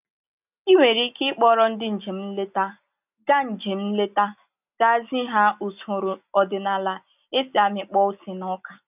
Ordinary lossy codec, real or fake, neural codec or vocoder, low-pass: none; real; none; 3.6 kHz